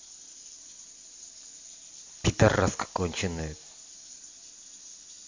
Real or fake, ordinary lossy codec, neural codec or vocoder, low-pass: real; MP3, 48 kbps; none; 7.2 kHz